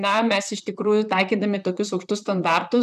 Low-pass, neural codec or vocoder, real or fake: 14.4 kHz; vocoder, 44.1 kHz, 128 mel bands, Pupu-Vocoder; fake